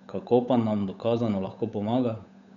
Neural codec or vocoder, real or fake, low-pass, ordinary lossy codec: codec, 16 kHz, 8 kbps, FunCodec, trained on Chinese and English, 25 frames a second; fake; 7.2 kHz; none